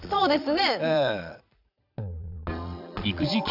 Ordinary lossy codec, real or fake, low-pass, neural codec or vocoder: none; fake; 5.4 kHz; vocoder, 22.05 kHz, 80 mel bands, Vocos